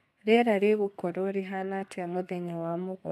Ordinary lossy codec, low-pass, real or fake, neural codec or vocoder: none; 14.4 kHz; fake; codec, 32 kHz, 1.9 kbps, SNAC